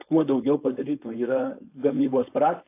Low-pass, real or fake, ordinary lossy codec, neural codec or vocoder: 3.6 kHz; fake; AAC, 24 kbps; codec, 16 kHz, 4.8 kbps, FACodec